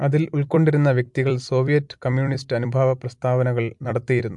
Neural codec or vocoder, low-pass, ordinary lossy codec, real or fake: vocoder, 44.1 kHz, 128 mel bands every 256 samples, BigVGAN v2; 10.8 kHz; MP3, 64 kbps; fake